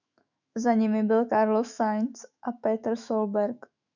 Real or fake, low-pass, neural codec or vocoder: fake; 7.2 kHz; autoencoder, 48 kHz, 128 numbers a frame, DAC-VAE, trained on Japanese speech